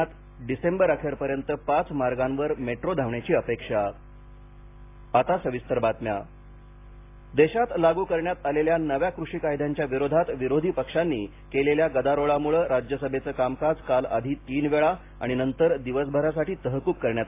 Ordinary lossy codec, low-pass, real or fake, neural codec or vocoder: AAC, 24 kbps; 3.6 kHz; real; none